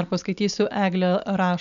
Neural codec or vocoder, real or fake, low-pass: none; real; 7.2 kHz